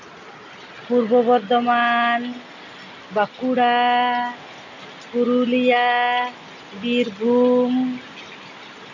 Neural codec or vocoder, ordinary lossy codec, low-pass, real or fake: none; none; 7.2 kHz; real